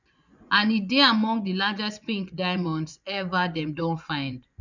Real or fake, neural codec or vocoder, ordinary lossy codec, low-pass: fake; vocoder, 24 kHz, 100 mel bands, Vocos; none; 7.2 kHz